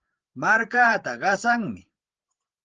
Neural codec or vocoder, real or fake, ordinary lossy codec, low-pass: codec, 16 kHz, 8 kbps, FreqCodec, larger model; fake; Opus, 16 kbps; 7.2 kHz